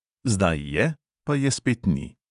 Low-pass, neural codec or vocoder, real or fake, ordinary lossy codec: 10.8 kHz; vocoder, 24 kHz, 100 mel bands, Vocos; fake; none